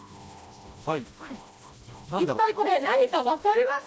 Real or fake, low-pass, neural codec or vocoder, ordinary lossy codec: fake; none; codec, 16 kHz, 1 kbps, FreqCodec, smaller model; none